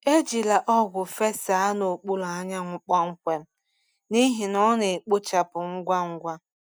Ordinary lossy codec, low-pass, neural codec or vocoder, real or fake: none; none; none; real